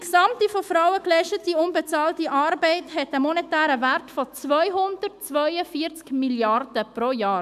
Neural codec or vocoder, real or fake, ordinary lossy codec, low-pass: autoencoder, 48 kHz, 128 numbers a frame, DAC-VAE, trained on Japanese speech; fake; AAC, 96 kbps; 14.4 kHz